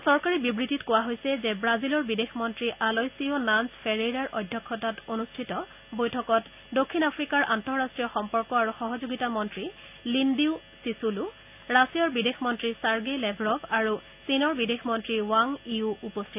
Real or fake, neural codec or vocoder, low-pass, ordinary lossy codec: real; none; 3.6 kHz; none